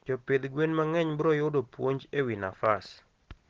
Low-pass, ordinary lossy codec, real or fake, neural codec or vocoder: 7.2 kHz; Opus, 16 kbps; real; none